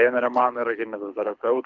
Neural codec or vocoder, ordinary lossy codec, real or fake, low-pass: codec, 24 kHz, 6 kbps, HILCodec; Opus, 64 kbps; fake; 7.2 kHz